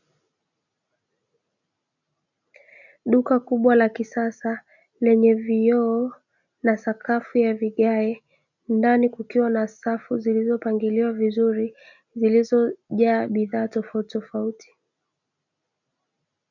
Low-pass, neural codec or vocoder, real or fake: 7.2 kHz; none; real